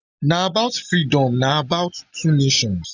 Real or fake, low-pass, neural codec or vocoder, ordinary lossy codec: real; 7.2 kHz; none; none